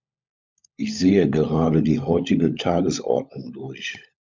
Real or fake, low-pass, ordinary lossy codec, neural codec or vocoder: fake; 7.2 kHz; MP3, 64 kbps; codec, 16 kHz, 16 kbps, FunCodec, trained on LibriTTS, 50 frames a second